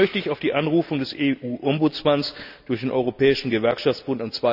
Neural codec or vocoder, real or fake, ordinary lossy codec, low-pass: none; real; none; 5.4 kHz